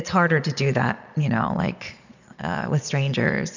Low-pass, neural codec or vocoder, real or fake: 7.2 kHz; vocoder, 22.05 kHz, 80 mel bands, Vocos; fake